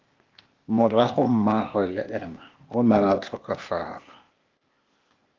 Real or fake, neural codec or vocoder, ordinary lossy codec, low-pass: fake; codec, 16 kHz, 0.8 kbps, ZipCodec; Opus, 32 kbps; 7.2 kHz